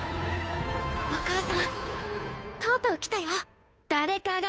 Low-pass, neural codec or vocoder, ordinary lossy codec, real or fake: none; codec, 16 kHz, 2 kbps, FunCodec, trained on Chinese and English, 25 frames a second; none; fake